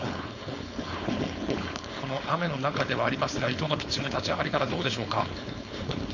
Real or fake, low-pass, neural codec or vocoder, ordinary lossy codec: fake; 7.2 kHz; codec, 16 kHz, 4.8 kbps, FACodec; Opus, 64 kbps